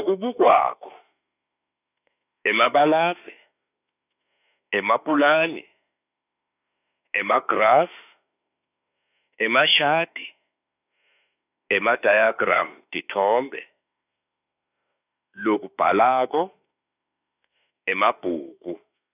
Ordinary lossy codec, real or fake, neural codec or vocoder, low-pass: none; fake; autoencoder, 48 kHz, 32 numbers a frame, DAC-VAE, trained on Japanese speech; 3.6 kHz